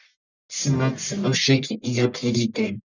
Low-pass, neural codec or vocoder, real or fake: 7.2 kHz; codec, 44.1 kHz, 1.7 kbps, Pupu-Codec; fake